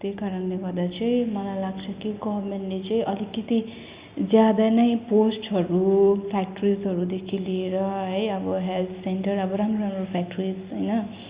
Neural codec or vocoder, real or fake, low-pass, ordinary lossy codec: none; real; 3.6 kHz; Opus, 64 kbps